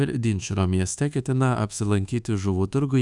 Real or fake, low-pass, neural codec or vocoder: fake; 10.8 kHz; codec, 24 kHz, 1.2 kbps, DualCodec